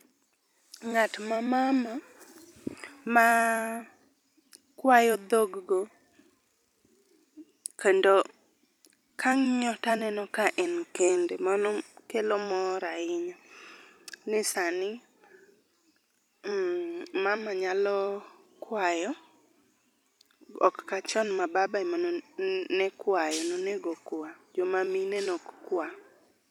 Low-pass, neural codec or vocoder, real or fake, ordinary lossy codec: 19.8 kHz; vocoder, 44.1 kHz, 128 mel bands every 256 samples, BigVGAN v2; fake; MP3, 96 kbps